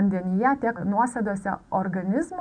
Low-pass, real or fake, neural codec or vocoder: 9.9 kHz; real; none